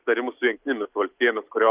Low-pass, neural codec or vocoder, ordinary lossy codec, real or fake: 3.6 kHz; none; Opus, 32 kbps; real